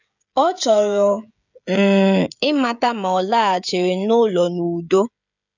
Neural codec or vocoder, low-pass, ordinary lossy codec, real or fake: codec, 16 kHz, 16 kbps, FreqCodec, smaller model; 7.2 kHz; none; fake